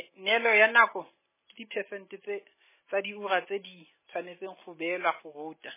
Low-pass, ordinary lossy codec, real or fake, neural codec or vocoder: 3.6 kHz; MP3, 16 kbps; real; none